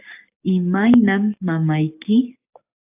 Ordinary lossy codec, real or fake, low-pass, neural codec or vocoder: AAC, 32 kbps; fake; 3.6 kHz; codec, 44.1 kHz, 7.8 kbps, DAC